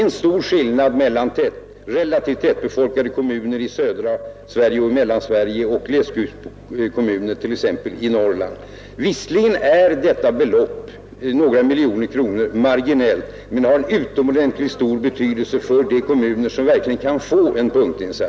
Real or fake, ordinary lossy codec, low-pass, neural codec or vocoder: real; none; none; none